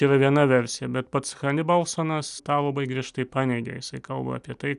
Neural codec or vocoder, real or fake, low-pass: none; real; 10.8 kHz